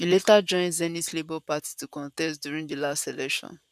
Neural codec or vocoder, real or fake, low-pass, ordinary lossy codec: vocoder, 44.1 kHz, 128 mel bands, Pupu-Vocoder; fake; 14.4 kHz; none